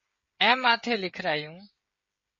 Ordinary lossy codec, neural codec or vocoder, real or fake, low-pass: MP3, 32 kbps; codec, 16 kHz, 16 kbps, FreqCodec, smaller model; fake; 7.2 kHz